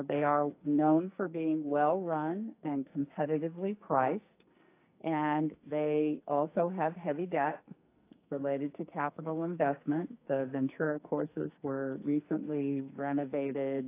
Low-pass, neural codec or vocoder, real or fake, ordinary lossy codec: 3.6 kHz; codec, 44.1 kHz, 2.6 kbps, SNAC; fake; AAC, 24 kbps